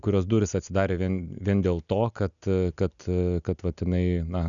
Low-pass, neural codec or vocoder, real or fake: 7.2 kHz; none; real